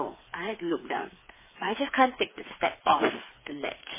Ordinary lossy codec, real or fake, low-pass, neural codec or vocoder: MP3, 16 kbps; fake; 3.6 kHz; codec, 16 kHz, 4 kbps, FreqCodec, smaller model